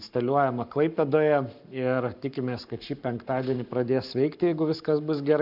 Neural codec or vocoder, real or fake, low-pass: codec, 44.1 kHz, 7.8 kbps, Pupu-Codec; fake; 5.4 kHz